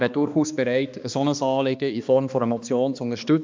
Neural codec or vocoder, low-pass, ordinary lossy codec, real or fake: codec, 16 kHz, 2 kbps, X-Codec, HuBERT features, trained on balanced general audio; 7.2 kHz; MP3, 64 kbps; fake